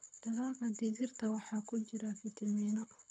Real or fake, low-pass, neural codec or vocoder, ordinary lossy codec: fake; 9.9 kHz; codec, 24 kHz, 6 kbps, HILCodec; none